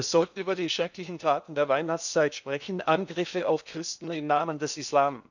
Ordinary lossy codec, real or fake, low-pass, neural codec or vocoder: none; fake; 7.2 kHz; codec, 16 kHz in and 24 kHz out, 0.8 kbps, FocalCodec, streaming, 65536 codes